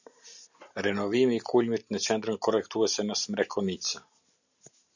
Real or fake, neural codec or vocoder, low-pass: real; none; 7.2 kHz